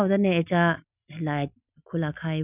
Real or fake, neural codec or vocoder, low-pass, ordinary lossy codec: real; none; 3.6 kHz; none